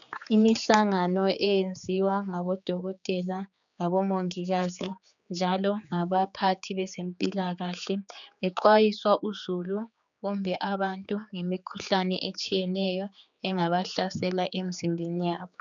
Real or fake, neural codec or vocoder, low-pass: fake; codec, 16 kHz, 4 kbps, X-Codec, HuBERT features, trained on general audio; 7.2 kHz